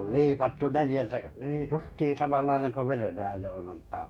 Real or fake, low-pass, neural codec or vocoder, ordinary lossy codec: fake; 19.8 kHz; codec, 44.1 kHz, 2.6 kbps, DAC; none